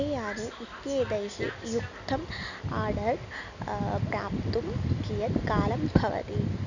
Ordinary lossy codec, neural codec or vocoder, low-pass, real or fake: none; none; 7.2 kHz; real